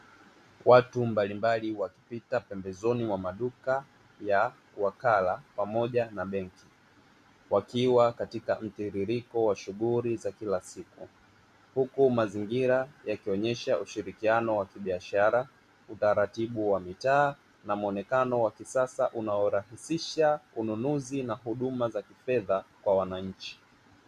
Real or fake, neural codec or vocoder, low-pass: real; none; 14.4 kHz